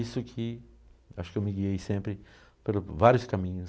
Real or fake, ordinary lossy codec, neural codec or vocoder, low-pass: real; none; none; none